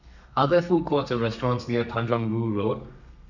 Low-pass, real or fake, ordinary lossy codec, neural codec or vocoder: 7.2 kHz; fake; none; codec, 32 kHz, 1.9 kbps, SNAC